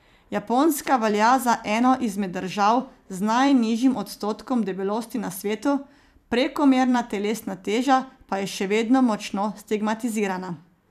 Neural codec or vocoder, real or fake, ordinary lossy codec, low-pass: vocoder, 44.1 kHz, 128 mel bands every 256 samples, BigVGAN v2; fake; none; 14.4 kHz